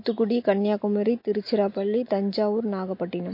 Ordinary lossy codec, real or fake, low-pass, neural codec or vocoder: MP3, 32 kbps; real; 5.4 kHz; none